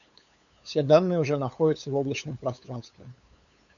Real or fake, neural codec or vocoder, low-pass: fake; codec, 16 kHz, 8 kbps, FunCodec, trained on LibriTTS, 25 frames a second; 7.2 kHz